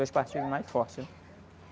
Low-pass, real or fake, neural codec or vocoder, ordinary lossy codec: none; real; none; none